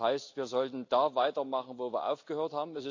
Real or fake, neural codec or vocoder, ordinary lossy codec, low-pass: real; none; AAC, 48 kbps; 7.2 kHz